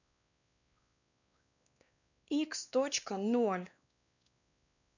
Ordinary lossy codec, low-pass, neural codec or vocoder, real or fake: none; 7.2 kHz; codec, 16 kHz, 2 kbps, X-Codec, WavLM features, trained on Multilingual LibriSpeech; fake